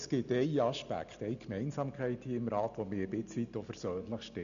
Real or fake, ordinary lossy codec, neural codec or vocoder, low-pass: real; none; none; 7.2 kHz